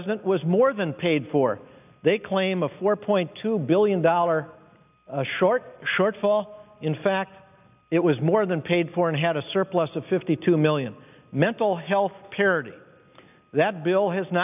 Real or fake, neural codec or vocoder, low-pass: real; none; 3.6 kHz